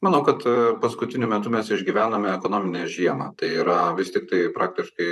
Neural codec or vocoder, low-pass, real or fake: vocoder, 44.1 kHz, 128 mel bands, Pupu-Vocoder; 14.4 kHz; fake